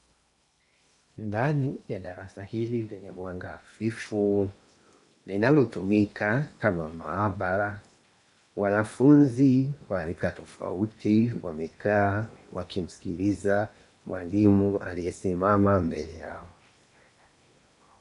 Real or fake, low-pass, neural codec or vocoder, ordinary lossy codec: fake; 10.8 kHz; codec, 16 kHz in and 24 kHz out, 0.8 kbps, FocalCodec, streaming, 65536 codes; AAC, 96 kbps